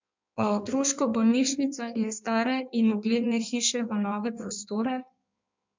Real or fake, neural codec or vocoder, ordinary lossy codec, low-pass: fake; codec, 16 kHz in and 24 kHz out, 1.1 kbps, FireRedTTS-2 codec; MP3, 64 kbps; 7.2 kHz